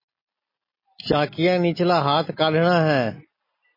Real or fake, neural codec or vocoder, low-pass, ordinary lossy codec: real; none; 5.4 kHz; MP3, 24 kbps